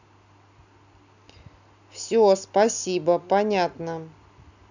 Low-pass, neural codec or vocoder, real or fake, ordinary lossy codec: 7.2 kHz; none; real; none